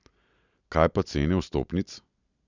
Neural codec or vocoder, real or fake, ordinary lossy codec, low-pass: none; real; none; 7.2 kHz